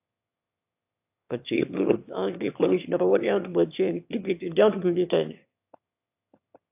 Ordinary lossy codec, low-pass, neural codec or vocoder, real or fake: AAC, 32 kbps; 3.6 kHz; autoencoder, 22.05 kHz, a latent of 192 numbers a frame, VITS, trained on one speaker; fake